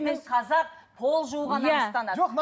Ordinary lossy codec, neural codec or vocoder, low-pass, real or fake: none; none; none; real